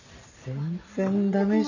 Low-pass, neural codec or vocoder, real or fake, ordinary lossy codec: 7.2 kHz; none; real; none